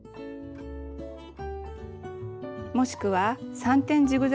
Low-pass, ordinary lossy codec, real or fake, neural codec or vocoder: none; none; real; none